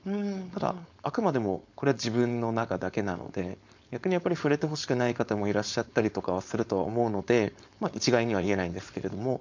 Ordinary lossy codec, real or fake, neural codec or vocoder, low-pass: MP3, 64 kbps; fake; codec, 16 kHz, 4.8 kbps, FACodec; 7.2 kHz